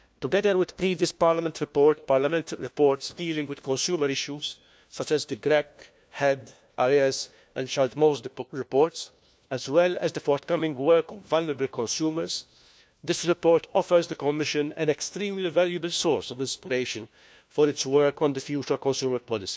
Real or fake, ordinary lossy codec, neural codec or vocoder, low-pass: fake; none; codec, 16 kHz, 1 kbps, FunCodec, trained on LibriTTS, 50 frames a second; none